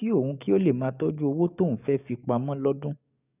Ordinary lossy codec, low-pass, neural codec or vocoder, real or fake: none; 3.6 kHz; codec, 44.1 kHz, 7.8 kbps, DAC; fake